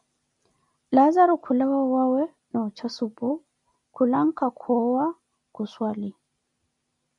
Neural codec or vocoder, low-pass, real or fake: none; 10.8 kHz; real